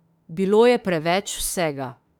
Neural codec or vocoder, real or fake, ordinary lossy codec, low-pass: autoencoder, 48 kHz, 32 numbers a frame, DAC-VAE, trained on Japanese speech; fake; none; 19.8 kHz